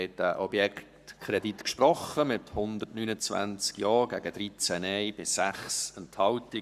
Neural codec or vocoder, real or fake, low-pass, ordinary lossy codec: codec, 44.1 kHz, 7.8 kbps, Pupu-Codec; fake; 14.4 kHz; none